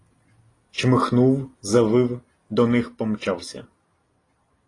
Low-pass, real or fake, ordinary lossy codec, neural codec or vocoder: 10.8 kHz; real; AAC, 32 kbps; none